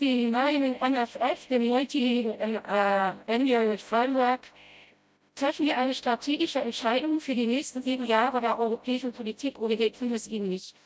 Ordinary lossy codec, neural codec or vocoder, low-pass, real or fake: none; codec, 16 kHz, 0.5 kbps, FreqCodec, smaller model; none; fake